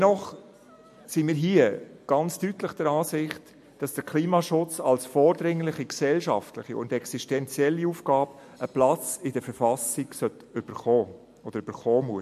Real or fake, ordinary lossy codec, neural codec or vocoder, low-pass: real; MP3, 64 kbps; none; 14.4 kHz